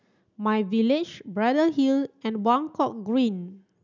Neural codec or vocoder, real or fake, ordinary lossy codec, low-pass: none; real; none; 7.2 kHz